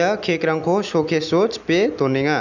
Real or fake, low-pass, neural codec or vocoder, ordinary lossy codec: real; 7.2 kHz; none; none